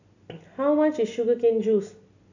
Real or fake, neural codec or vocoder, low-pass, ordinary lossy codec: real; none; 7.2 kHz; none